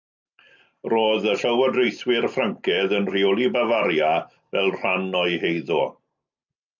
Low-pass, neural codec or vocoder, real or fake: 7.2 kHz; none; real